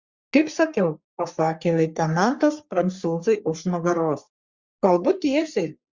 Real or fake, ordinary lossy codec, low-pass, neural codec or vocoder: fake; Opus, 64 kbps; 7.2 kHz; codec, 44.1 kHz, 3.4 kbps, Pupu-Codec